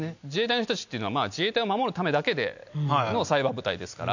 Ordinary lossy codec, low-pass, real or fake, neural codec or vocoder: none; 7.2 kHz; real; none